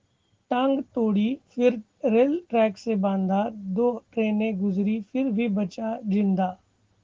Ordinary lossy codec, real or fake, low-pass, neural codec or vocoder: Opus, 16 kbps; real; 7.2 kHz; none